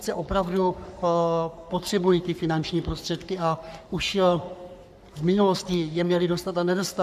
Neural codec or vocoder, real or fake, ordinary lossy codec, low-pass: codec, 44.1 kHz, 3.4 kbps, Pupu-Codec; fake; AAC, 96 kbps; 14.4 kHz